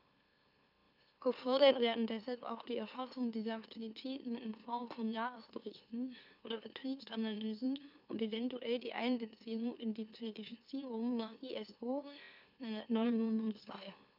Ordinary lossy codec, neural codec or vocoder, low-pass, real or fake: none; autoencoder, 44.1 kHz, a latent of 192 numbers a frame, MeloTTS; 5.4 kHz; fake